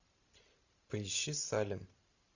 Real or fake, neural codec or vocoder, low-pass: fake; vocoder, 44.1 kHz, 128 mel bands every 512 samples, BigVGAN v2; 7.2 kHz